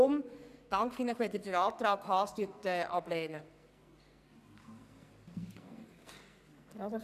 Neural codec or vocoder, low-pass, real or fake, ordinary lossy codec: codec, 44.1 kHz, 2.6 kbps, SNAC; 14.4 kHz; fake; none